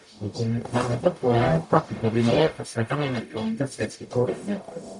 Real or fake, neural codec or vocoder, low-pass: fake; codec, 44.1 kHz, 0.9 kbps, DAC; 10.8 kHz